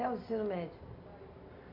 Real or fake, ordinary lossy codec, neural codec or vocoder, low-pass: real; none; none; 5.4 kHz